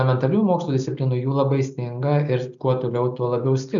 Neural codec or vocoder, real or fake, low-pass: none; real; 7.2 kHz